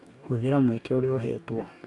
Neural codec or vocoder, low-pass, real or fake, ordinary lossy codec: codec, 44.1 kHz, 2.6 kbps, DAC; 10.8 kHz; fake; AAC, 48 kbps